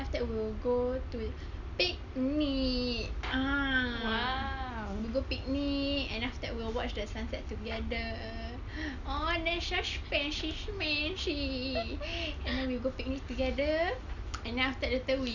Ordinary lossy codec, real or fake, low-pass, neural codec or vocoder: none; real; 7.2 kHz; none